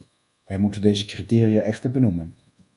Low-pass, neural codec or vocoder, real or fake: 10.8 kHz; codec, 24 kHz, 1.2 kbps, DualCodec; fake